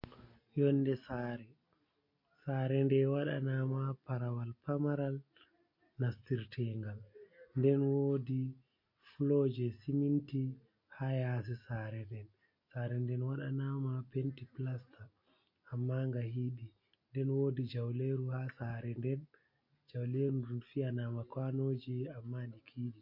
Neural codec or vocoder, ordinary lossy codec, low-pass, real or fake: none; MP3, 32 kbps; 5.4 kHz; real